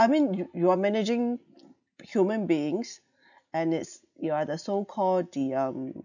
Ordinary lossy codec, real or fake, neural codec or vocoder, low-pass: none; real; none; 7.2 kHz